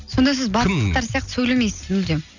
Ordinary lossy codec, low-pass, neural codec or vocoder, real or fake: none; 7.2 kHz; none; real